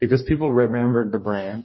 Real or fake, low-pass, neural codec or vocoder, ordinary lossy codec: fake; 7.2 kHz; codec, 44.1 kHz, 2.6 kbps, DAC; MP3, 24 kbps